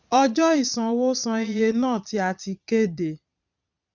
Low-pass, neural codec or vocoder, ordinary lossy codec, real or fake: 7.2 kHz; vocoder, 22.05 kHz, 80 mel bands, Vocos; none; fake